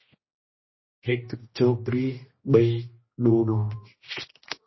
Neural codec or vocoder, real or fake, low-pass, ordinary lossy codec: codec, 16 kHz, 1 kbps, X-Codec, HuBERT features, trained on general audio; fake; 7.2 kHz; MP3, 24 kbps